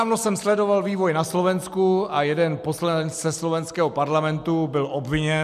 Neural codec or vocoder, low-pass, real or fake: none; 14.4 kHz; real